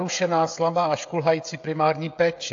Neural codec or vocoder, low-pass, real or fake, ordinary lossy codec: codec, 16 kHz, 16 kbps, FreqCodec, smaller model; 7.2 kHz; fake; AAC, 48 kbps